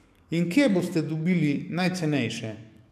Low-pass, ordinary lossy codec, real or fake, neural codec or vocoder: 14.4 kHz; none; fake; codec, 44.1 kHz, 7.8 kbps, DAC